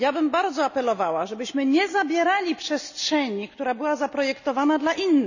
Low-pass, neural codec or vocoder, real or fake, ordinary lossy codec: 7.2 kHz; none; real; none